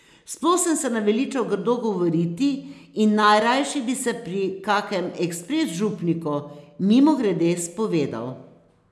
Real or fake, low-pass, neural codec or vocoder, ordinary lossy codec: real; none; none; none